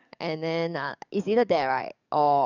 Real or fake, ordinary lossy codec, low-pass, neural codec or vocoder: fake; none; 7.2 kHz; codec, 44.1 kHz, 7.8 kbps, DAC